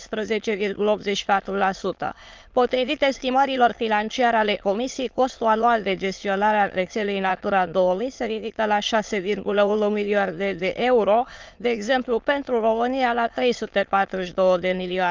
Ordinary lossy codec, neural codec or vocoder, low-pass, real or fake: Opus, 24 kbps; autoencoder, 22.05 kHz, a latent of 192 numbers a frame, VITS, trained on many speakers; 7.2 kHz; fake